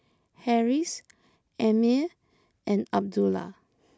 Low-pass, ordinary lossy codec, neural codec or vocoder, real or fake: none; none; none; real